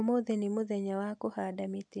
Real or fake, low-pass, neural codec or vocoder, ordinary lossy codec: real; 9.9 kHz; none; none